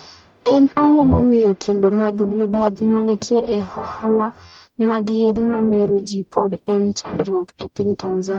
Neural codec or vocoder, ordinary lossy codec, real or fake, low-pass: codec, 44.1 kHz, 0.9 kbps, DAC; none; fake; 19.8 kHz